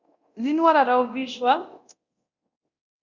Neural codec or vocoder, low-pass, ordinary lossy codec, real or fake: codec, 24 kHz, 0.9 kbps, DualCodec; 7.2 kHz; Opus, 64 kbps; fake